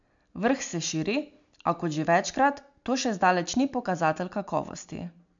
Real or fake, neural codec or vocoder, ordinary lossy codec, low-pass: real; none; MP3, 64 kbps; 7.2 kHz